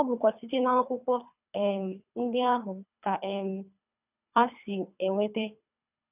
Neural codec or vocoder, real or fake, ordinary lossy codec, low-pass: codec, 24 kHz, 3 kbps, HILCodec; fake; none; 3.6 kHz